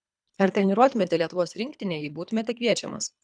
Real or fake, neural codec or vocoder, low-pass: fake; codec, 24 kHz, 3 kbps, HILCodec; 9.9 kHz